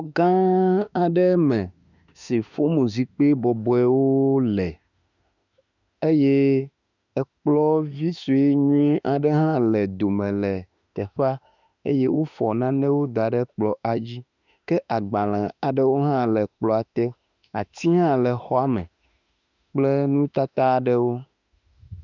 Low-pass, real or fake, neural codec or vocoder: 7.2 kHz; fake; autoencoder, 48 kHz, 32 numbers a frame, DAC-VAE, trained on Japanese speech